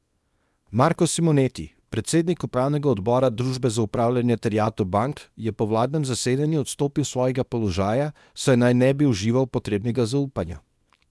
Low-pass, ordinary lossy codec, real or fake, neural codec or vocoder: none; none; fake; codec, 24 kHz, 0.9 kbps, WavTokenizer, small release